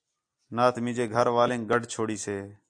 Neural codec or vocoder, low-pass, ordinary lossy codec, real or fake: none; 9.9 kHz; AAC, 64 kbps; real